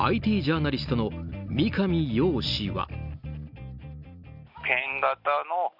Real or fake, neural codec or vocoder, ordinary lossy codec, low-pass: real; none; none; 5.4 kHz